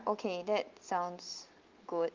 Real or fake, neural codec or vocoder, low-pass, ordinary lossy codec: real; none; 7.2 kHz; Opus, 32 kbps